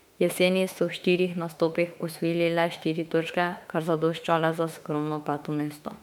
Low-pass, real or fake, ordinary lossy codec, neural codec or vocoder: 19.8 kHz; fake; MP3, 96 kbps; autoencoder, 48 kHz, 32 numbers a frame, DAC-VAE, trained on Japanese speech